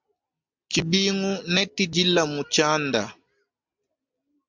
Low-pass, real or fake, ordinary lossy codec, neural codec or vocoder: 7.2 kHz; real; MP3, 64 kbps; none